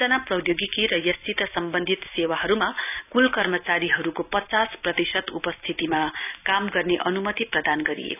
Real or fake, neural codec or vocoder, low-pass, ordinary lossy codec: real; none; 3.6 kHz; none